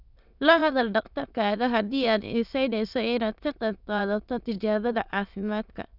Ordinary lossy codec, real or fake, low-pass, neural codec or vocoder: none; fake; 5.4 kHz; autoencoder, 22.05 kHz, a latent of 192 numbers a frame, VITS, trained on many speakers